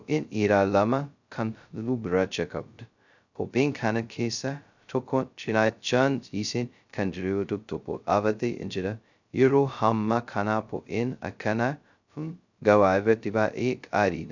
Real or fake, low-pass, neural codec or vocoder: fake; 7.2 kHz; codec, 16 kHz, 0.2 kbps, FocalCodec